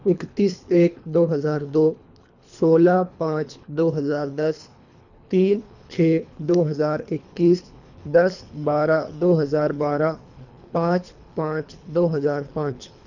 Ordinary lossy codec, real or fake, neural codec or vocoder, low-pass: none; fake; codec, 24 kHz, 3 kbps, HILCodec; 7.2 kHz